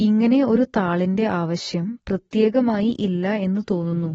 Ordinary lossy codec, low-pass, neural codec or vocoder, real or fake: AAC, 24 kbps; 19.8 kHz; none; real